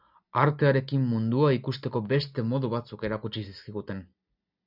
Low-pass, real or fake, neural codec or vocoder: 5.4 kHz; real; none